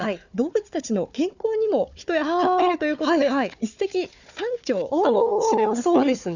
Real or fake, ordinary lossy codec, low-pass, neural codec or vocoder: fake; none; 7.2 kHz; codec, 16 kHz, 4 kbps, FunCodec, trained on Chinese and English, 50 frames a second